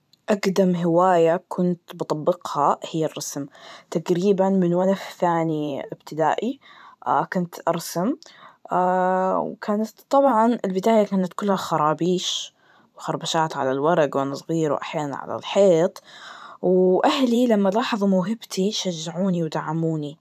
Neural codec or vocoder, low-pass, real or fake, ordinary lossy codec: vocoder, 44.1 kHz, 128 mel bands every 512 samples, BigVGAN v2; 14.4 kHz; fake; none